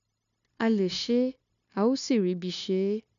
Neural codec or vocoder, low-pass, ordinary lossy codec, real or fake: codec, 16 kHz, 0.9 kbps, LongCat-Audio-Codec; 7.2 kHz; none; fake